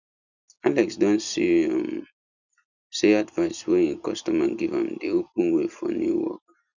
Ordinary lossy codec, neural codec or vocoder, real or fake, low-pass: none; none; real; 7.2 kHz